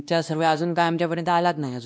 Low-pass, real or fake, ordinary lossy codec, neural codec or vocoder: none; fake; none; codec, 16 kHz, 1 kbps, X-Codec, WavLM features, trained on Multilingual LibriSpeech